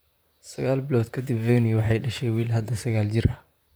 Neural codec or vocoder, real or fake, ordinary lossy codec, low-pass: vocoder, 44.1 kHz, 128 mel bands, Pupu-Vocoder; fake; none; none